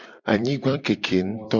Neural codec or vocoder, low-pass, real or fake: vocoder, 44.1 kHz, 128 mel bands every 256 samples, BigVGAN v2; 7.2 kHz; fake